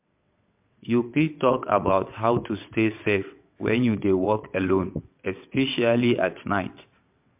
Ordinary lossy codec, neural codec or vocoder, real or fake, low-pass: MP3, 32 kbps; vocoder, 22.05 kHz, 80 mel bands, WaveNeXt; fake; 3.6 kHz